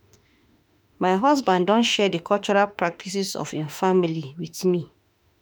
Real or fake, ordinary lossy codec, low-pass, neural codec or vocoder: fake; none; none; autoencoder, 48 kHz, 32 numbers a frame, DAC-VAE, trained on Japanese speech